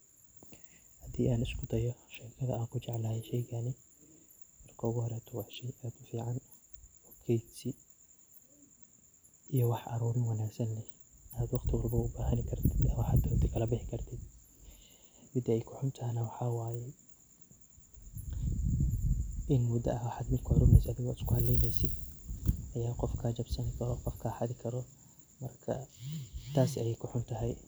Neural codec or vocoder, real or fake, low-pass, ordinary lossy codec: none; real; none; none